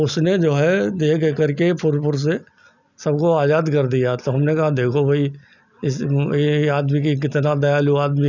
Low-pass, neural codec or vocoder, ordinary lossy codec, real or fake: 7.2 kHz; none; none; real